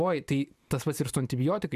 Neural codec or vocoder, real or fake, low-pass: vocoder, 44.1 kHz, 128 mel bands every 512 samples, BigVGAN v2; fake; 14.4 kHz